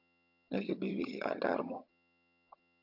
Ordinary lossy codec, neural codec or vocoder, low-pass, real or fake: AAC, 48 kbps; vocoder, 22.05 kHz, 80 mel bands, HiFi-GAN; 5.4 kHz; fake